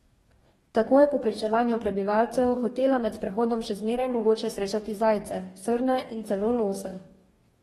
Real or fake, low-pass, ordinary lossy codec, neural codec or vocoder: fake; 19.8 kHz; AAC, 32 kbps; codec, 44.1 kHz, 2.6 kbps, DAC